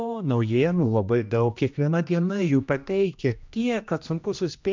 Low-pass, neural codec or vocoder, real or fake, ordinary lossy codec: 7.2 kHz; codec, 16 kHz, 1 kbps, X-Codec, HuBERT features, trained on general audio; fake; MP3, 64 kbps